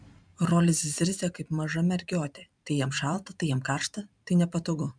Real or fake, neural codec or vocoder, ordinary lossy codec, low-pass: fake; vocoder, 44.1 kHz, 128 mel bands every 512 samples, BigVGAN v2; MP3, 96 kbps; 9.9 kHz